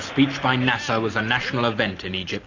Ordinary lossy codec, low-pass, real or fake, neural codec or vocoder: AAC, 48 kbps; 7.2 kHz; real; none